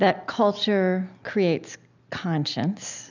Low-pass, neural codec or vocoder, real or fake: 7.2 kHz; none; real